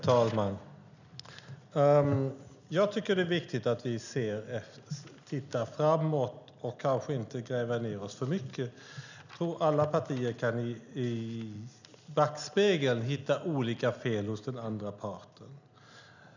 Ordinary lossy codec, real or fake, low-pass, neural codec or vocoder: none; real; 7.2 kHz; none